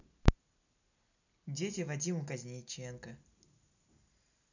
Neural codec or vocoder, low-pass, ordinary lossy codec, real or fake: none; 7.2 kHz; none; real